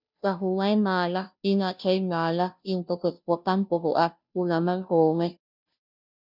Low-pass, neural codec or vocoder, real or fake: 5.4 kHz; codec, 16 kHz, 0.5 kbps, FunCodec, trained on Chinese and English, 25 frames a second; fake